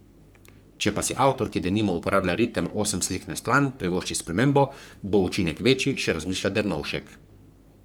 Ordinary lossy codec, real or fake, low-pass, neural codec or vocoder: none; fake; none; codec, 44.1 kHz, 3.4 kbps, Pupu-Codec